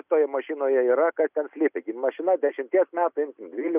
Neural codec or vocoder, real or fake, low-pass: none; real; 3.6 kHz